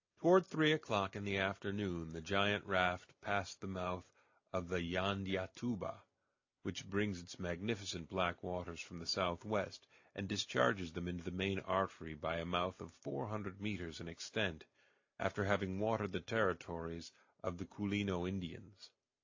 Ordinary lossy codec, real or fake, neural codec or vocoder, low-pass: AAC, 48 kbps; real; none; 7.2 kHz